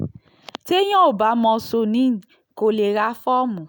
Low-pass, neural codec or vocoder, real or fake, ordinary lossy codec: none; none; real; none